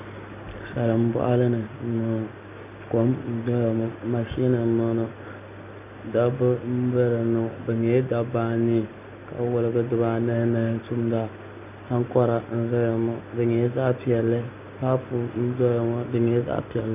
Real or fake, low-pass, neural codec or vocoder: real; 3.6 kHz; none